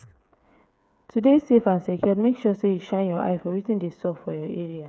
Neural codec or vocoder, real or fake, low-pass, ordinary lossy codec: codec, 16 kHz, 8 kbps, FreqCodec, smaller model; fake; none; none